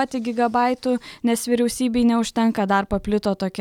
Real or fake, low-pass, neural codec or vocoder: real; 19.8 kHz; none